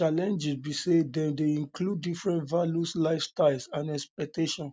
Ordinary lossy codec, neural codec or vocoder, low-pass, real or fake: none; none; none; real